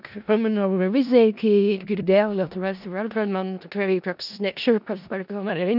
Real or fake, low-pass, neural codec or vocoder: fake; 5.4 kHz; codec, 16 kHz in and 24 kHz out, 0.4 kbps, LongCat-Audio-Codec, four codebook decoder